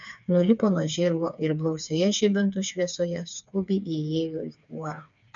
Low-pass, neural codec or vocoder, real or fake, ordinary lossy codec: 7.2 kHz; codec, 16 kHz, 4 kbps, FreqCodec, smaller model; fake; MP3, 96 kbps